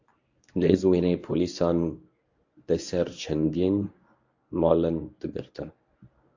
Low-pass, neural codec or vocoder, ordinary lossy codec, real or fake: 7.2 kHz; codec, 24 kHz, 0.9 kbps, WavTokenizer, medium speech release version 1; AAC, 48 kbps; fake